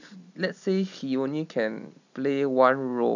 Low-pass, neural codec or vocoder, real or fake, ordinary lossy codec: 7.2 kHz; none; real; none